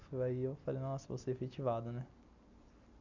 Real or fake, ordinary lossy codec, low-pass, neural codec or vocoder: real; none; 7.2 kHz; none